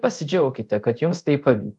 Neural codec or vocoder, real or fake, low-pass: codec, 24 kHz, 0.5 kbps, DualCodec; fake; 10.8 kHz